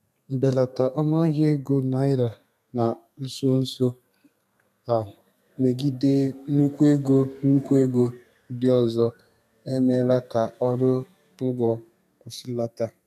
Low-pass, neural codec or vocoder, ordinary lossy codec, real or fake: 14.4 kHz; codec, 32 kHz, 1.9 kbps, SNAC; none; fake